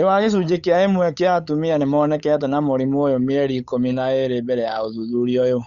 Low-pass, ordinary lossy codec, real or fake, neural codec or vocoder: 7.2 kHz; Opus, 64 kbps; fake; codec, 16 kHz, 8 kbps, FunCodec, trained on Chinese and English, 25 frames a second